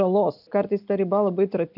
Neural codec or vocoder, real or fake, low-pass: none; real; 5.4 kHz